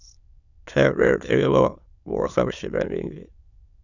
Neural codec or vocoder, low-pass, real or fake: autoencoder, 22.05 kHz, a latent of 192 numbers a frame, VITS, trained on many speakers; 7.2 kHz; fake